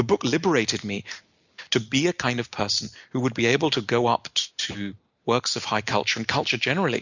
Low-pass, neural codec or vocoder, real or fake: 7.2 kHz; none; real